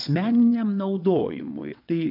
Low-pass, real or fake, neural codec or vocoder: 5.4 kHz; fake; vocoder, 22.05 kHz, 80 mel bands, WaveNeXt